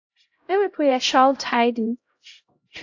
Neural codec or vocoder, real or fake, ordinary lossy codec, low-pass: codec, 16 kHz, 0.5 kbps, X-Codec, HuBERT features, trained on LibriSpeech; fake; AAC, 48 kbps; 7.2 kHz